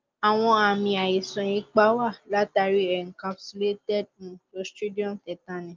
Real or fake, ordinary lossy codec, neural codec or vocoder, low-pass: real; Opus, 32 kbps; none; 7.2 kHz